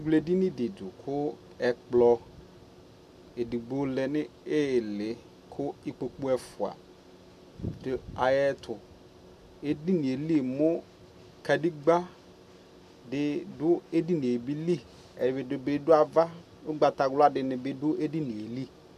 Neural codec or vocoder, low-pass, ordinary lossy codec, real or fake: none; 14.4 kHz; MP3, 96 kbps; real